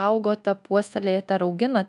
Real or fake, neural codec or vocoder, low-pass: fake; codec, 24 kHz, 1.2 kbps, DualCodec; 10.8 kHz